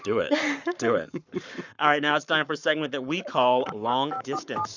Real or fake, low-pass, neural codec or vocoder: fake; 7.2 kHz; codec, 44.1 kHz, 7.8 kbps, Pupu-Codec